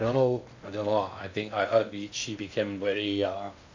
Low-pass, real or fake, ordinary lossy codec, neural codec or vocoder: 7.2 kHz; fake; MP3, 64 kbps; codec, 16 kHz in and 24 kHz out, 0.6 kbps, FocalCodec, streaming, 2048 codes